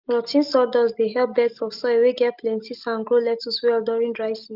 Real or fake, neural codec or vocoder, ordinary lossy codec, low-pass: real; none; Opus, 32 kbps; 5.4 kHz